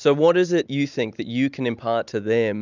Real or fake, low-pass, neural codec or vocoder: real; 7.2 kHz; none